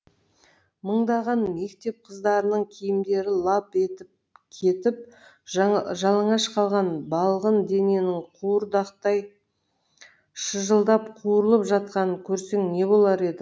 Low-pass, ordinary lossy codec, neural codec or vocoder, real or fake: none; none; none; real